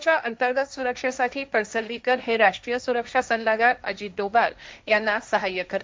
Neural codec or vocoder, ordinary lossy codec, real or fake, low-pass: codec, 16 kHz, 1.1 kbps, Voila-Tokenizer; none; fake; none